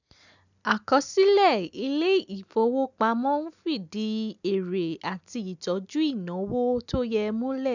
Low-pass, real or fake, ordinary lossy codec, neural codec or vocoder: 7.2 kHz; real; none; none